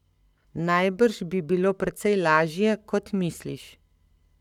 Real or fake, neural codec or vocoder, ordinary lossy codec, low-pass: fake; codec, 44.1 kHz, 7.8 kbps, Pupu-Codec; none; 19.8 kHz